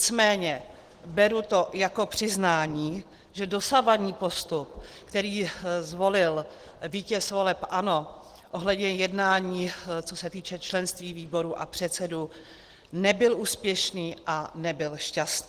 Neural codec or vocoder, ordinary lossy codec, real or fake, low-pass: none; Opus, 16 kbps; real; 14.4 kHz